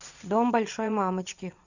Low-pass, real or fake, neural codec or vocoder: 7.2 kHz; fake; vocoder, 22.05 kHz, 80 mel bands, WaveNeXt